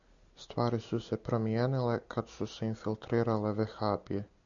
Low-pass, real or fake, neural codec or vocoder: 7.2 kHz; real; none